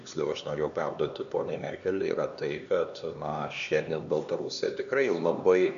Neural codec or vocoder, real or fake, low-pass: codec, 16 kHz, 2 kbps, X-Codec, HuBERT features, trained on LibriSpeech; fake; 7.2 kHz